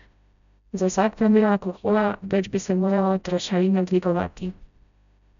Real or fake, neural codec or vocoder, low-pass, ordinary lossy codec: fake; codec, 16 kHz, 0.5 kbps, FreqCodec, smaller model; 7.2 kHz; none